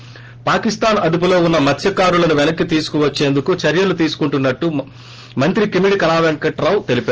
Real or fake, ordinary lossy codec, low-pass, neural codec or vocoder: real; Opus, 16 kbps; 7.2 kHz; none